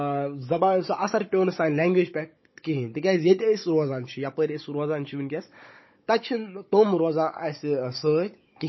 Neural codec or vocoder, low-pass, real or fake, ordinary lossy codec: codec, 16 kHz, 16 kbps, FunCodec, trained on Chinese and English, 50 frames a second; 7.2 kHz; fake; MP3, 24 kbps